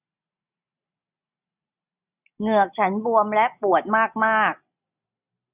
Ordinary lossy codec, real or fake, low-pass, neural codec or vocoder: none; real; 3.6 kHz; none